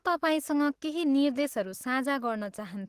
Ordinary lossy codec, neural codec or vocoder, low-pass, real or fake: Opus, 16 kbps; autoencoder, 48 kHz, 128 numbers a frame, DAC-VAE, trained on Japanese speech; 14.4 kHz; fake